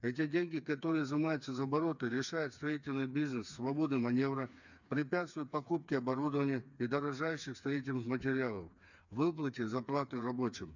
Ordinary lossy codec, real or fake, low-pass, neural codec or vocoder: none; fake; 7.2 kHz; codec, 16 kHz, 4 kbps, FreqCodec, smaller model